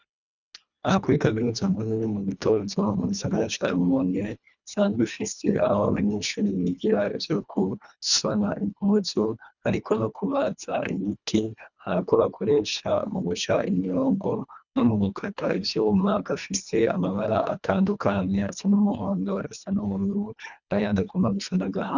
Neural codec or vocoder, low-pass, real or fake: codec, 24 kHz, 1.5 kbps, HILCodec; 7.2 kHz; fake